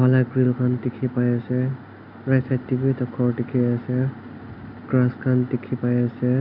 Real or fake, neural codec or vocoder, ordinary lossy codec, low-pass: real; none; none; 5.4 kHz